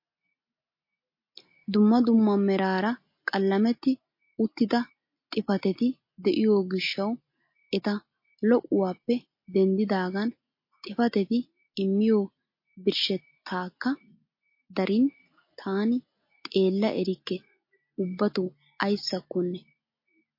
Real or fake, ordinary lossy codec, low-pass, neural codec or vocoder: real; MP3, 32 kbps; 5.4 kHz; none